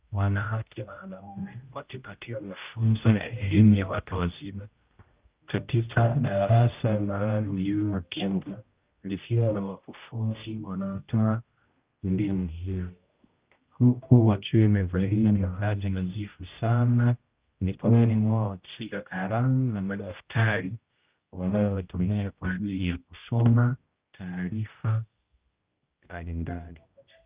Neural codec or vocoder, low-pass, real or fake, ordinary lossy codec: codec, 16 kHz, 0.5 kbps, X-Codec, HuBERT features, trained on general audio; 3.6 kHz; fake; Opus, 32 kbps